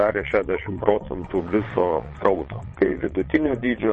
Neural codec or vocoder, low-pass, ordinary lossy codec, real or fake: vocoder, 22.05 kHz, 80 mel bands, WaveNeXt; 9.9 kHz; MP3, 32 kbps; fake